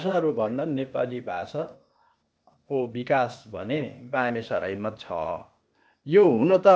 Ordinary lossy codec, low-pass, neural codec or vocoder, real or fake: none; none; codec, 16 kHz, 0.8 kbps, ZipCodec; fake